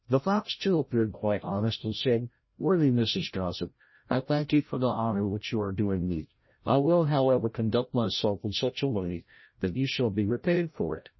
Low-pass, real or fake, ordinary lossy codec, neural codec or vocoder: 7.2 kHz; fake; MP3, 24 kbps; codec, 16 kHz, 0.5 kbps, FreqCodec, larger model